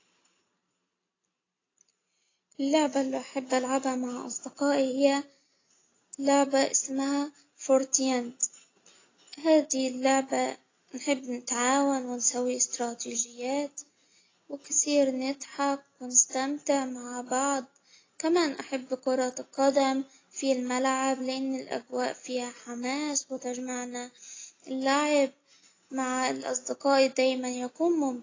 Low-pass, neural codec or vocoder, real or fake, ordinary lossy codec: 7.2 kHz; none; real; AAC, 32 kbps